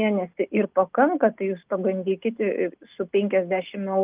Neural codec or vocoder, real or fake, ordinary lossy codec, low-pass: none; real; Opus, 32 kbps; 3.6 kHz